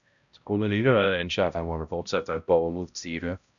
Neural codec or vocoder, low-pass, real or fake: codec, 16 kHz, 0.5 kbps, X-Codec, HuBERT features, trained on balanced general audio; 7.2 kHz; fake